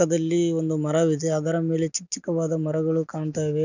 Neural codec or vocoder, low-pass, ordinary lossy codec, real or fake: none; 7.2 kHz; none; real